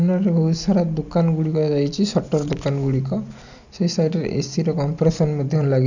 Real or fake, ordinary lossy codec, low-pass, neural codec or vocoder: real; none; 7.2 kHz; none